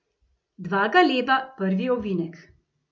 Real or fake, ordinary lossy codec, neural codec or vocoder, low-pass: real; none; none; none